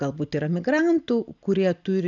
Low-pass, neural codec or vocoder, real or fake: 7.2 kHz; none; real